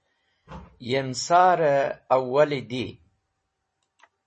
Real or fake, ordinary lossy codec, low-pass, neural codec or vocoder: real; MP3, 32 kbps; 10.8 kHz; none